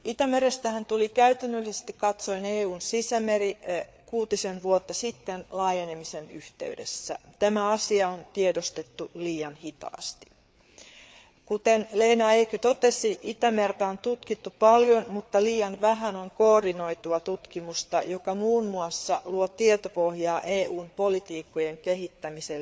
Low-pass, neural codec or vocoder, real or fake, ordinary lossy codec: none; codec, 16 kHz, 4 kbps, FreqCodec, larger model; fake; none